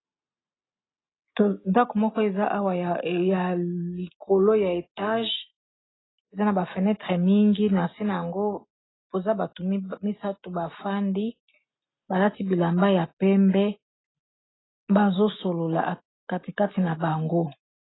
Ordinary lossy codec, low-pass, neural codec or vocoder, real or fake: AAC, 16 kbps; 7.2 kHz; none; real